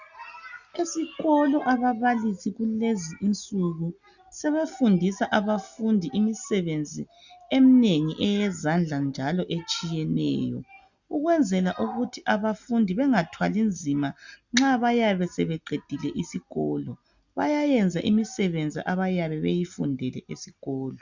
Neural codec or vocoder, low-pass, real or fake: none; 7.2 kHz; real